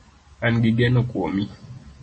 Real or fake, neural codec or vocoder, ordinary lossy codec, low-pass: real; none; MP3, 32 kbps; 9.9 kHz